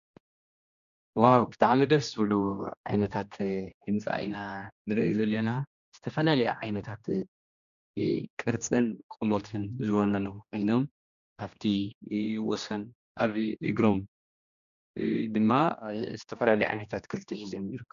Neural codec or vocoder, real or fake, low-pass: codec, 16 kHz, 1 kbps, X-Codec, HuBERT features, trained on general audio; fake; 7.2 kHz